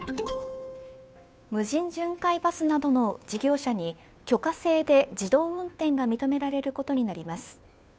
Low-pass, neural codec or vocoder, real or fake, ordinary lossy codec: none; codec, 16 kHz, 2 kbps, FunCodec, trained on Chinese and English, 25 frames a second; fake; none